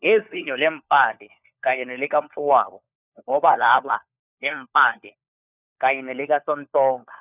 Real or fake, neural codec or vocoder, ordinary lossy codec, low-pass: fake; codec, 16 kHz, 4 kbps, FunCodec, trained on LibriTTS, 50 frames a second; none; 3.6 kHz